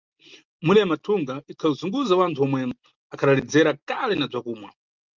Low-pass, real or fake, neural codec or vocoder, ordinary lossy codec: 7.2 kHz; real; none; Opus, 32 kbps